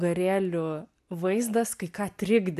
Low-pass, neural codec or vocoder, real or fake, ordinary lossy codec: 14.4 kHz; none; real; AAC, 96 kbps